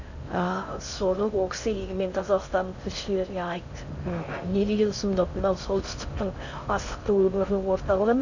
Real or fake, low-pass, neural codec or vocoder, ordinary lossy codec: fake; 7.2 kHz; codec, 16 kHz in and 24 kHz out, 0.6 kbps, FocalCodec, streaming, 2048 codes; none